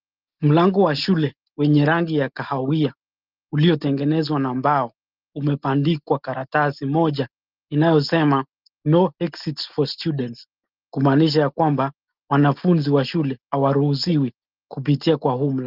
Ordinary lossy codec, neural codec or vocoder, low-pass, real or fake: Opus, 32 kbps; none; 5.4 kHz; real